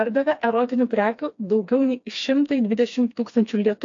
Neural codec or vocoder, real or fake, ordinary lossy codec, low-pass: codec, 16 kHz, 2 kbps, FreqCodec, smaller model; fake; AAC, 48 kbps; 7.2 kHz